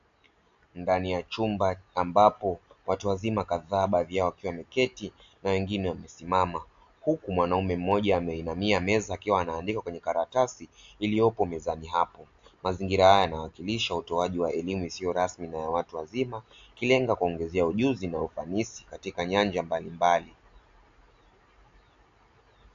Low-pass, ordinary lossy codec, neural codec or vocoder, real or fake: 7.2 kHz; AAC, 64 kbps; none; real